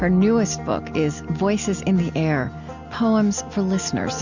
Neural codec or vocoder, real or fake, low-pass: none; real; 7.2 kHz